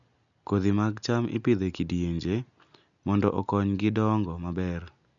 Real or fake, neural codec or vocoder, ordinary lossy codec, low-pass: real; none; MP3, 96 kbps; 7.2 kHz